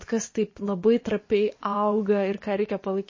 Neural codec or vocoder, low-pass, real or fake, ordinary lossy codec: vocoder, 24 kHz, 100 mel bands, Vocos; 7.2 kHz; fake; MP3, 32 kbps